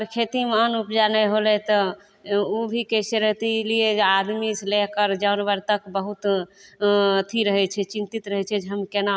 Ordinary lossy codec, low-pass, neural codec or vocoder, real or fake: none; none; none; real